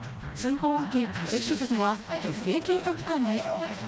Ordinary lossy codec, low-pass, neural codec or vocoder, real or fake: none; none; codec, 16 kHz, 1 kbps, FreqCodec, smaller model; fake